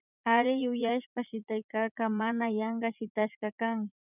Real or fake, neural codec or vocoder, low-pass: fake; vocoder, 44.1 kHz, 80 mel bands, Vocos; 3.6 kHz